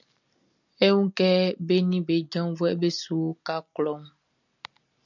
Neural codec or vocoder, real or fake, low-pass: none; real; 7.2 kHz